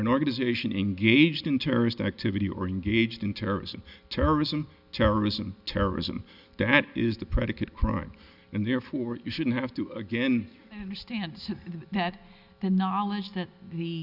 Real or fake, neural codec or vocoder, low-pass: real; none; 5.4 kHz